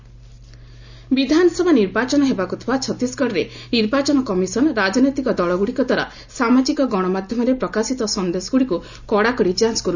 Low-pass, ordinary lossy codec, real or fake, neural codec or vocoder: 7.2 kHz; Opus, 64 kbps; real; none